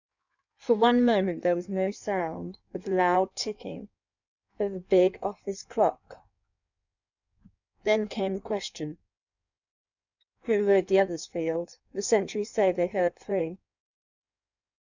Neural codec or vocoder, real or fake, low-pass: codec, 16 kHz in and 24 kHz out, 1.1 kbps, FireRedTTS-2 codec; fake; 7.2 kHz